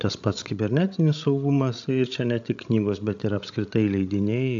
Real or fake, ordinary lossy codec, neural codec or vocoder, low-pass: fake; AAC, 64 kbps; codec, 16 kHz, 16 kbps, FreqCodec, larger model; 7.2 kHz